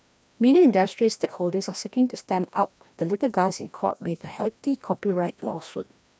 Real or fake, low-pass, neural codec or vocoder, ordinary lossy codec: fake; none; codec, 16 kHz, 1 kbps, FreqCodec, larger model; none